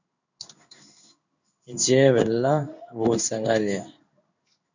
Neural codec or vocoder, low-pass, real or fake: codec, 16 kHz in and 24 kHz out, 1 kbps, XY-Tokenizer; 7.2 kHz; fake